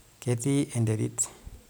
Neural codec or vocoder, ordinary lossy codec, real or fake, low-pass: none; none; real; none